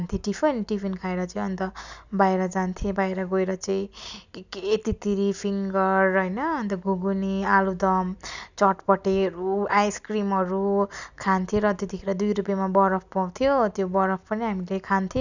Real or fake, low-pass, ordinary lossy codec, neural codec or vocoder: real; 7.2 kHz; none; none